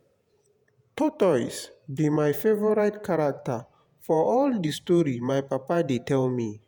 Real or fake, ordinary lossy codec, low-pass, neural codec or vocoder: fake; none; none; vocoder, 48 kHz, 128 mel bands, Vocos